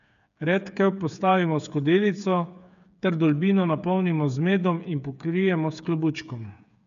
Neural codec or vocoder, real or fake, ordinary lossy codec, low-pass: codec, 16 kHz, 8 kbps, FreqCodec, smaller model; fake; none; 7.2 kHz